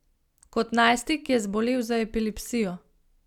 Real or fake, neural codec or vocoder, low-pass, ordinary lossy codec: real; none; 19.8 kHz; none